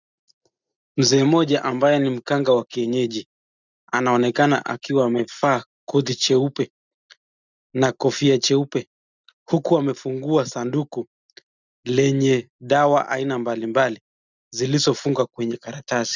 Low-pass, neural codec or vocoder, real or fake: 7.2 kHz; none; real